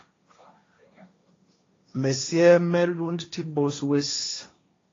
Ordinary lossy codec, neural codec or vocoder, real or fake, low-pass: AAC, 32 kbps; codec, 16 kHz, 1.1 kbps, Voila-Tokenizer; fake; 7.2 kHz